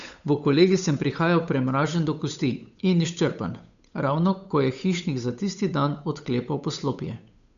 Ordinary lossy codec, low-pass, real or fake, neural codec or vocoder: MP3, 96 kbps; 7.2 kHz; fake; codec, 16 kHz, 8 kbps, FunCodec, trained on Chinese and English, 25 frames a second